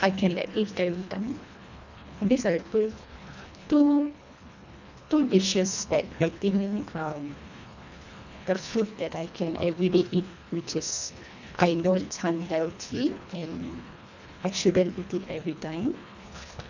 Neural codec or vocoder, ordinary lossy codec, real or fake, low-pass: codec, 24 kHz, 1.5 kbps, HILCodec; none; fake; 7.2 kHz